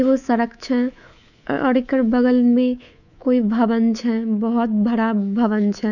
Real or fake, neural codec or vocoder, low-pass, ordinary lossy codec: fake; codec, 24 kHz, 3.1 kbps, DualCodec; 7.2 kHz; none